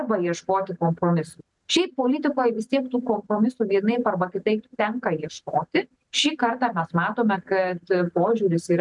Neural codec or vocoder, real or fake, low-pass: none; real; 10.8 kHz